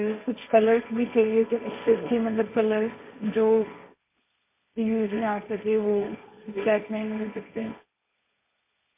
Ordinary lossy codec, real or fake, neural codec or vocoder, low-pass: MP3, 32 kbps; fake; codec, 16 kHz, 1.1 kbps, Voila-Tokenizer; 3.6 kHz